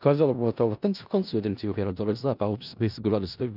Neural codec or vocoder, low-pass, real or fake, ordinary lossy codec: codec, 16 kHz in and 24 kHz out, 0.4 kbps, LongCat-Audio-Codec, four codebook decoder; 5.4 kHz; fake; none